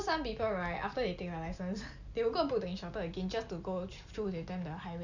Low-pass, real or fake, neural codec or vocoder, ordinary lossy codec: 7.2 kHz; real; none; none